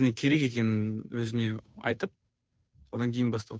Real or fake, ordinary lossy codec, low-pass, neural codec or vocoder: fake; none; none; codec, 16 kHz, 2 kbps, FunCodec, trained on Chinese and English, 25 frames a second